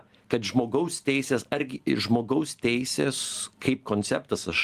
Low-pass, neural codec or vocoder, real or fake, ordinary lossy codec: 14.4 kHz; none; real; Opus, 24 kbps